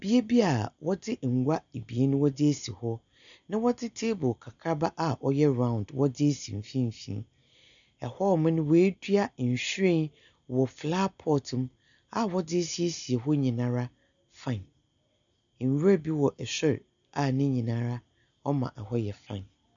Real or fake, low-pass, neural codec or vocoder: real; 7.2 kHz; none